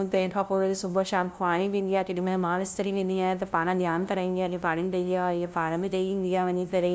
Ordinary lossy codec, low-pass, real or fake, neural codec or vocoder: none; none; fake; codec, 16 kHz, 0.5 kbps, FunCodec, trained on LibriTTS, 25 frames a second